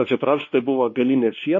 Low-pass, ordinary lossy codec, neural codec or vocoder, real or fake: 7.2 kHz; MP3, 32 kbps; codec, 16 kHz, 2 kbps, X-Codec, WavLM features, trained on Multilingual LibriSpeech; fake